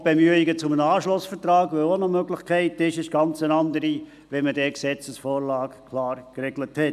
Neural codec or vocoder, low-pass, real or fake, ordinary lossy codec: none; 14.4 kHz; real; none